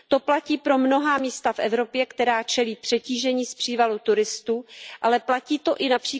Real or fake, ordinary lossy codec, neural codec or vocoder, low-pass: real; none; none; none